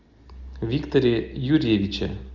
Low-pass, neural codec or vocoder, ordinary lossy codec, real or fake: 7.2 kHz; none; Opus, 32 kbps; real